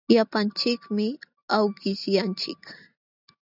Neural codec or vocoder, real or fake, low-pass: none; real; 5.4 kHz